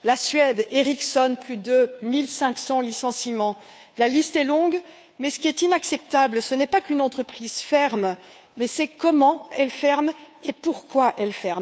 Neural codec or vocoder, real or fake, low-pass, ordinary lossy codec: codec, 16 kHz, 2 kbps, FunCodec, trained on Chinese and English, 25 frames a second; fake; none; none